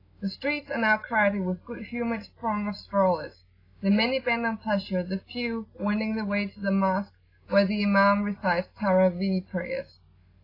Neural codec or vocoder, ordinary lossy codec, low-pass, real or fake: none; AAC, 24 kbps; 5.4 kHz; real